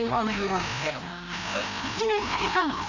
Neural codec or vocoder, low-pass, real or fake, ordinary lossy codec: codec, 16 kHz, 1 kbps, FreqCodec, larger model; 7.2 kHz; fake; none